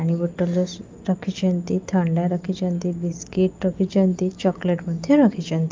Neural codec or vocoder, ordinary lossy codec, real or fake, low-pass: none; Opus, 24 kbps; real; 7.2 kHz